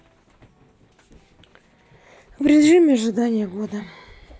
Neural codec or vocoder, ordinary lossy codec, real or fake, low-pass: none; none; real; none